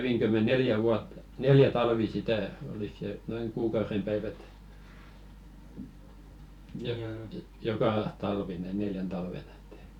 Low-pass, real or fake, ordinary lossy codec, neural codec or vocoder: 19.8 kHz; fake; none; vocoder, 44.1 kHz, 128 mel bands every 512 samples, BigVGAN v2